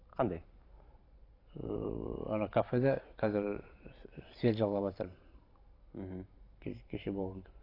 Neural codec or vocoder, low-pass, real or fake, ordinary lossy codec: vocoder, 44.1 kHz, 128 mel bands every 512 samples, BigVGAN v2; 5.4 kHz; fake; AAC, 32 kbps